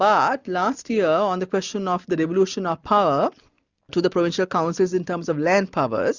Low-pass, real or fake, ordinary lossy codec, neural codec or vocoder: 7.2 kHz; real; Opus, 64 kbps; none